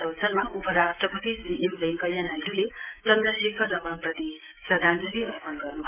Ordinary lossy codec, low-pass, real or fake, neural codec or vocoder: none; 3.6 kHz; fake; codec, 16 kHz, 6 kbps, DAC